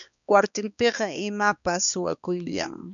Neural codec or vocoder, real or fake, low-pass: codec, 16 kHz, 2 kbps, X-Codec, HuBERT features, trained on balanced general audio; fake; 7.2 kHz